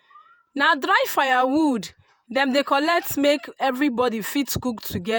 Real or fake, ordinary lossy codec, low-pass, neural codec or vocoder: fake; none; none; vocoder, 48 kHz, 128 mel bands, Vocos